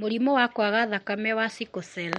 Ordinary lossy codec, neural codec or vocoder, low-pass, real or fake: MP3, 48 kbps; none; 19.8 kHz; real